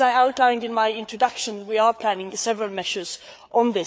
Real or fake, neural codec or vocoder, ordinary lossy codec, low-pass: fake; codec, 16 kHz, 4 kbps, FreqCodec, larger model; none; none